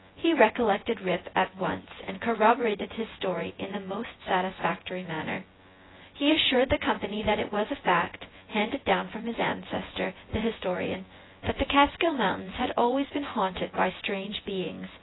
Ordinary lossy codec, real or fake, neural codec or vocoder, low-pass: AAC, 16 kbps; fake; vocoder, 24 kHz, 100 mel bands, Vocos; 7.2 kHz